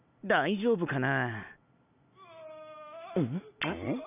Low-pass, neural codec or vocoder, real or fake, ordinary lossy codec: 3.6 kHz; none; real; none